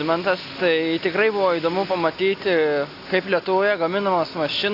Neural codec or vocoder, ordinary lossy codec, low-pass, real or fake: none; AAC, 32 kbps; 5.4 kHz; real